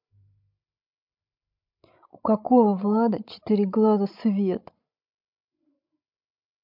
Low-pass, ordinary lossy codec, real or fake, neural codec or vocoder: 5.4 kHz; MP3, 48 kbps; fake; codec, 16 kHz, 16 kbps, FreqCodec, larger model